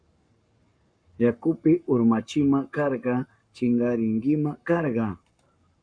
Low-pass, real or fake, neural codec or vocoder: 9.9 kHz; fake; codec, 44.1 kHz, 7.8 kbps, Pupu-Codec